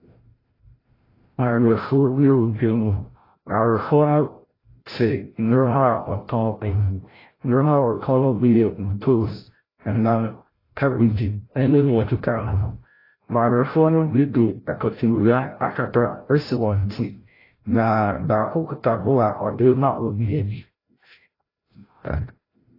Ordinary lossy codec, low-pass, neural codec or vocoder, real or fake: AAC, 24 kbps; 5.4 kHz; codec, 16 kHz, 0.5 kbps, FreqCodec, larger model; fake